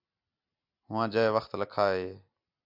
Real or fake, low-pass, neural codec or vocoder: real; 5.4 kHz; none